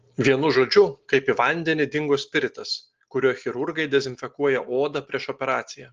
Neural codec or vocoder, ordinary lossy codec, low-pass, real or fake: none; Opus, 16 kbps; 7.2 kHz; real